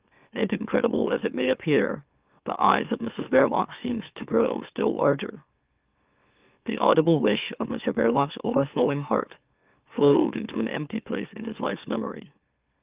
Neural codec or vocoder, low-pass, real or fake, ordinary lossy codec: autoencoder, 44.1 kHz, a latent of 192 numbers a frame, MeloTTS; 3.6 kHz; fake; Opus, 32 kbps